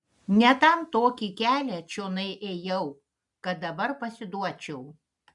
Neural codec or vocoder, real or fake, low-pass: none; real; 10.8 kHz